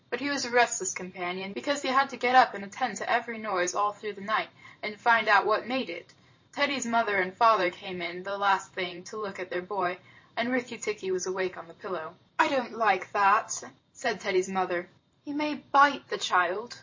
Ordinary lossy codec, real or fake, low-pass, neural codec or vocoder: MP3, 32 kbps; fake; 7.2 kHz; vocoder, 44.1 kHz, 128 mel bands every 512 samples, BigVGAN v2